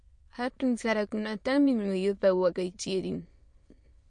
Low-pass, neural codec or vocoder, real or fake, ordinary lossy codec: 9.9 kHz; autoencoder, 22.05 kHz, a latent of 192 numbers a frame, VITS, trained on many speakers; fake; MP3, 48 kbps